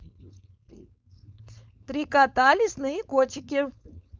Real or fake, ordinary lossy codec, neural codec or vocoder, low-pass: fake; none; codec, 16 kHz, 4.8 kbps, FACodec; none